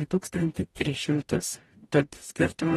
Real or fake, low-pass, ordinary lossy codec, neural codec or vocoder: fake; 19.8 kHz; AAC, 32 kbps; codec, 44.1 kHz, 0.9 kbps, DAC